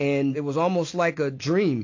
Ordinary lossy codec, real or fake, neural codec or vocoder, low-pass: AAC, 48 kbps; real; none; 7.2 kHz